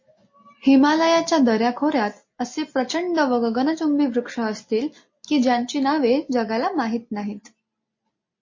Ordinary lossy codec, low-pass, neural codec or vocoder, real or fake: MP3, 32 kbps; 7.2 kHz; none; real